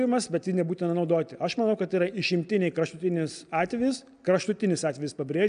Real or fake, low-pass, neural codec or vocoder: real; 9.9 kHz; none